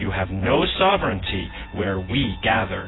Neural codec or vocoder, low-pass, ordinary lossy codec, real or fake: vocoder, 24 kHz, 100 mel bands, Vocos; 7.2 kHz; AAC, 16 kbps; fake